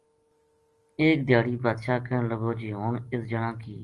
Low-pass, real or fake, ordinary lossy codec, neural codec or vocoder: 10.8 kHz; real; Opus, 24 kbps; none